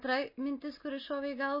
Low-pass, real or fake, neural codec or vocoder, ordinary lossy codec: 5.4 kHz; real; none; MP3, 24 kbps